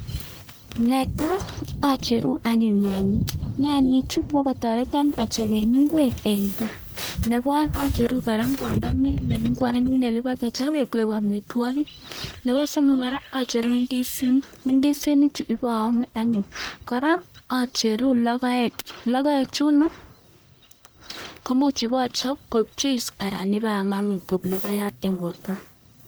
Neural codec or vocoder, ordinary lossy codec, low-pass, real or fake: codec, 44.1 kHz, 1.7 kbps, Pupu-Codec; none; none; fake